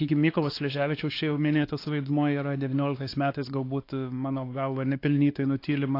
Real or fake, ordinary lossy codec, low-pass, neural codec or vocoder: fake; AAC, 32 kbps; 5.4 kHz; codec, 16 kHz, 2 kbps, X-Codec, WavLM features, trained on Multilingual LibriSpeech